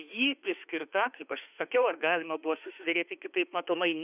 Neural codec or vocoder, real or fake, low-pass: autoencoder, 48 kHz, 32 numbers a frame, DAC-VAE, trained on Japanese speech; fake; 3.6 kHz